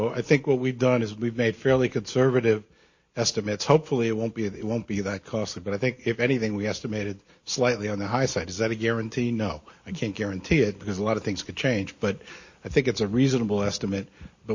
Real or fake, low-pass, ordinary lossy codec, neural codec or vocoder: real; 7.2 kHz; MP3, 32 kbps; none